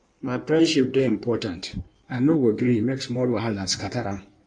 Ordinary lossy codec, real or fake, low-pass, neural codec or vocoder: AAC, 64 kbps; fake; 9.9 kHz; codec, 16 kHz in and 24 kHz out, 1.1 kbps, FireRedTTS-2 codec